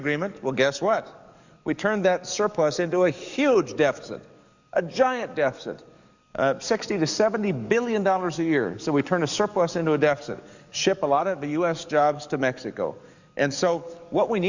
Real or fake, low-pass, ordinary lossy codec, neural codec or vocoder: fake; 7.2 kHz; Opus, 64 kbps; codec, 44.1 kHz, 7.8 kbps, DAC